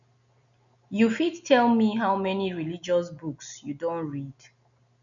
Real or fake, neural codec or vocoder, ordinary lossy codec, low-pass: real; none; none; 7.2 kHz